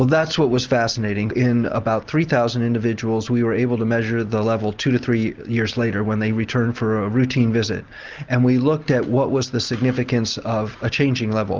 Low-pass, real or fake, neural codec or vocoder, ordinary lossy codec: 7.2 kHz; real; none; Opus, 24 kbps